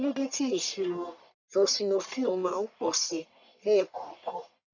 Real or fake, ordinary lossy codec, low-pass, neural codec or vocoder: fake; none; 7.2 kHz; codec, 44.1 kHz, 1.7 kbps, Pupu-Codec